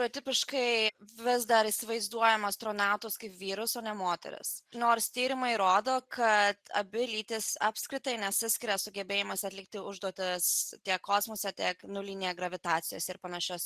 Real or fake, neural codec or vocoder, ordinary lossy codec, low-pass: real; none; Opus, 64 kbps; 14.4 kHz